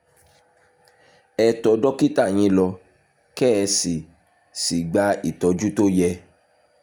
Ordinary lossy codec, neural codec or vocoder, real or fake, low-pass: none; none; real; 19.8 kHz